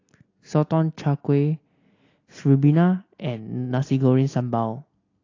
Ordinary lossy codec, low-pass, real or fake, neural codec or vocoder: AAC, 32 kbps; 7.2 kHz; real; none